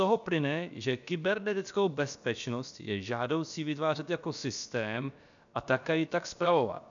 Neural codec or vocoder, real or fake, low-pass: codec, 16 kHz, about 1 kbps, DyCAST, with the encoder's durations; fake; 7.2 kHz